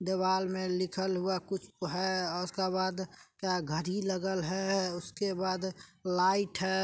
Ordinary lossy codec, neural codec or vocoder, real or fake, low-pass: none; none; real; none